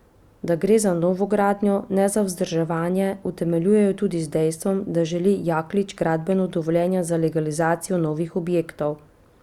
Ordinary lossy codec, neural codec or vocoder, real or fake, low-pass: Opus, 64 kbps; none; real; 19.8 kHz